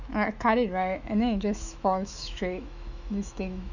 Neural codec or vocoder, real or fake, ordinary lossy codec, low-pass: autoencoder, 48 kHz, 128 numbers a frame, DAC-VAE, trained on Japanese speech; fake; none; 7.2 kHz